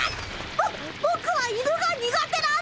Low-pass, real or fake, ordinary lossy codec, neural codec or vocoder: none; real; none; none